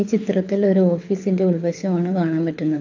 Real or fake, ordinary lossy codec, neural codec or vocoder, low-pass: fake; AAC, 48 kbps; codec, 24 kHz, 6 kbps, HILCodec; 7.2 kHz